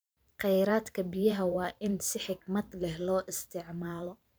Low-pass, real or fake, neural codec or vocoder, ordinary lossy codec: none; fake; vocoder, 44.1 kHz, 128 mel bands every 512 samples, BigVGAN v2; none